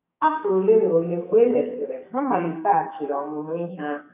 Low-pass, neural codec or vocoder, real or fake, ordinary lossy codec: 3.6 kHz; codec, 44.1 kHz, 2.6 kbps, SNAC; fake; none